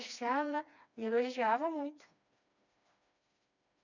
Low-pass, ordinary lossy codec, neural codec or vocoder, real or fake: 7.2 kHz; none; codec, 16 kHz, 2 kbps, FreqCodec, smaller model; fake